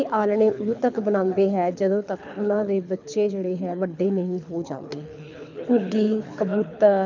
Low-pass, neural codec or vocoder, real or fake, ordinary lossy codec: 7.2 kHz; codec, 24 kHz, 6 kbps, HILCodec; fake; none